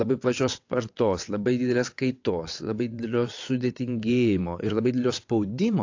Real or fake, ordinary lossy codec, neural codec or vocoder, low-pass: fake; AAC, 48 kbps; vocoder, 22.05 kHz, 80 mel bands, WaveNeXt; 7.2 kHz